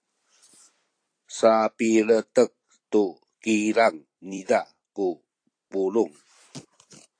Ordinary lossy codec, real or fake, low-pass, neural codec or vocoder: AAC, 48 kbps; fake; 9.9 kHz; vocoder, 44.1 kHz, 128 mel bands every 256 samples, BigVGAN v2